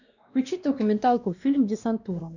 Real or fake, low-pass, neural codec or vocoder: fake; 7.2 kHz; codec, 16 kHz, 1 kbps, X-Codec, WavLM features, trained on Multilingual LibriSpeech